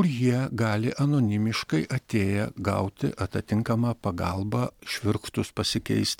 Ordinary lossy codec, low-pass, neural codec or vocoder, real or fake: MP3, 96 kbps; 19.8 kHz; none; real